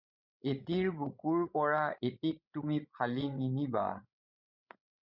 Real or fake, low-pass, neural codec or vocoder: fake; 5.4 kHz; codec, 16 kHz, 16 kbps, FreqCodec, larger model